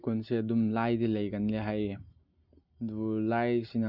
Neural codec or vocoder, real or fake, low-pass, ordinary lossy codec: none; real; 5.4 kHz; none